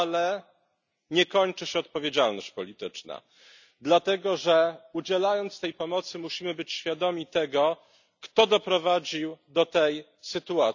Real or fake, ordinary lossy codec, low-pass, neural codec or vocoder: real; none; 7.2 kHz; none